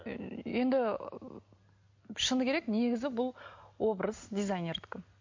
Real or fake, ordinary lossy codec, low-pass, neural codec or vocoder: real; MP3, 48 kbps; 7.2 kHz; none